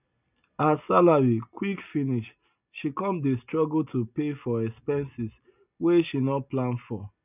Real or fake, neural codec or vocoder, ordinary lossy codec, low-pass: real; none; none; 3.6 kHz